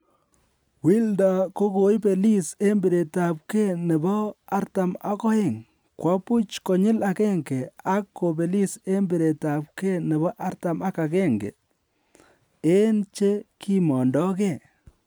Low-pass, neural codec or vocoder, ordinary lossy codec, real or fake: none; none; none; real